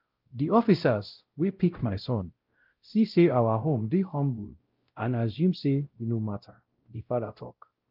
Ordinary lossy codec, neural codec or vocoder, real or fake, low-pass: Opus, 32 kbps; codec, 16 kHz, 0.5 kbps, X-Codec, WavLM features, trained on Multilingual LibriSpeech; fake; 5.4 kHz